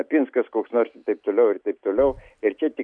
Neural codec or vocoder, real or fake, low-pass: none; real; 9.9 kHz